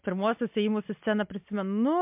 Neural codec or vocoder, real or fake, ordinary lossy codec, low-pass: none; real; MP3, 32 kbps; 3.6 kHz